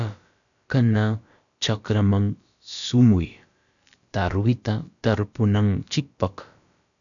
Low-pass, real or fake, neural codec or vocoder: 7.2 kHz; fake; codec, 16 kHz, about 1 kbps, DyCAST, with the encoder's durations